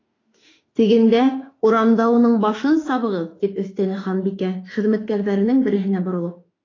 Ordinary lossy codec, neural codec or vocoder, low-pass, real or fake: AAC, 32 kbps; autoencoder, 48 kHz, 32 numbers a frame, DAC-VAE, trained on Japanese speech; 7.2 kHz; fake